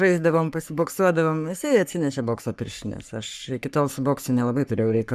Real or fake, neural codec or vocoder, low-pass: fake; codec, 44.1 kHz, 3.4 kbps, Pupu-Codec; 14.4 kHz